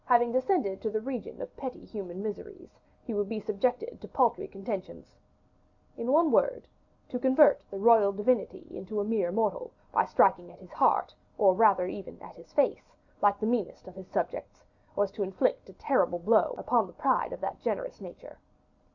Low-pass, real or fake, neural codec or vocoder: 7.2 kHz; real; none